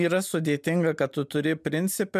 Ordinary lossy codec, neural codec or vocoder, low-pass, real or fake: MP3, 96 kbps; none; 14.4 kHz; real